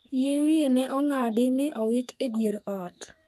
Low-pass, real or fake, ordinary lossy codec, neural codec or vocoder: 14.4 kHz; fake; none; codec, 32 kHz, 1.9 kbps, SNAC